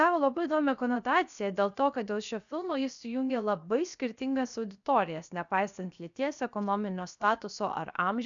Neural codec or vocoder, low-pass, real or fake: codec, 16 kHz, 0.7 kbps, FocalCodec; 7.2 kHz; fake